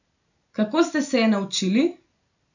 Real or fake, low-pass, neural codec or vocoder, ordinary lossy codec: real; 7.2 kHz; none; none